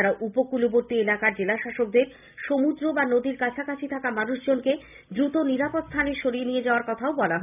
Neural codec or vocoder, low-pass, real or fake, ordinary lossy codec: none; 3.6 kHz; real; none